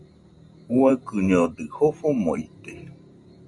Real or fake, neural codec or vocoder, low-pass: fake; vocoder, 44.1 kHz, 128 mel bands every 512 samples, BigVGAN v2; 10.8 kHz